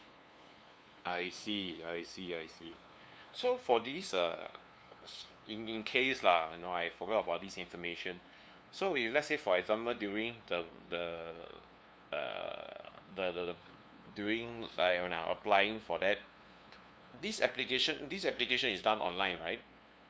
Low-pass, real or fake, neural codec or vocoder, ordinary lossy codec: none; fake; codec, 16 kHz, 2 kbps, FunCodec, trained on LibriTTS, 25 frames a second; none